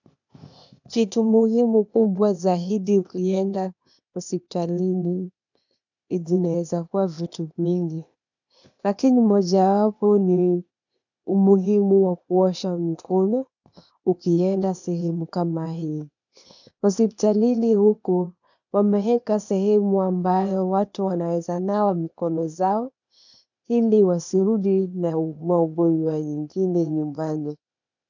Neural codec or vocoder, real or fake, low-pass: codec, 16 kHz, 0.8 kbps, ZipCodec; fake; 7.2 kHz